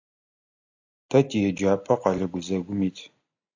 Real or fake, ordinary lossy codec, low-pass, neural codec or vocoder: real; AAC, 48 kbps; 7.2 kHz; none